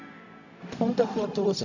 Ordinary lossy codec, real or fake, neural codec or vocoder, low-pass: none; fake; codec, 16 kHz, 0.4 kbps, LongCat-Audio-Codec; 7.2 kHz